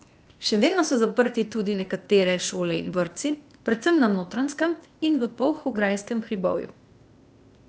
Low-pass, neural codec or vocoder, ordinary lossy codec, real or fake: none; codec, 16 kHz, 0.8 kbps, ZipCodec; none; fake